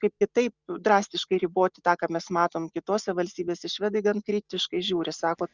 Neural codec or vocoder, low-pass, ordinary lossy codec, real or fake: none; 7.2 kHz; Opus, 64 kbps; real